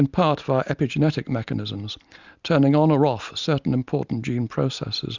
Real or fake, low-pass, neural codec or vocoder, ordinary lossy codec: real; 7.2 kHz; none; Opus, 64 kbps